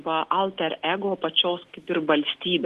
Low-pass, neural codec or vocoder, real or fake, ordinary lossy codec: 14.4 kHz; none; real; Opus, 16 kbps